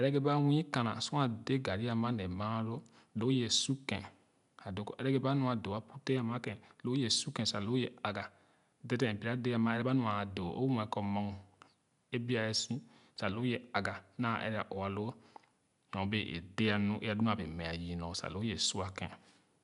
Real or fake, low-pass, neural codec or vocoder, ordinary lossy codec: real; 10.8 kHz; none; none